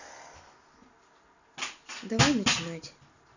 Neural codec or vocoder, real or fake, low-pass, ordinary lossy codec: none; real; 7.2 kHz; none